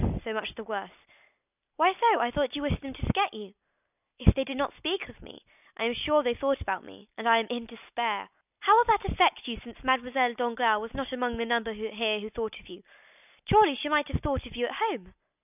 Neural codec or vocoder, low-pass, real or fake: none; 3.6 kHz; real